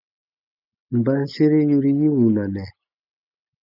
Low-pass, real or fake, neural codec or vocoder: 5.4 kHz; real; none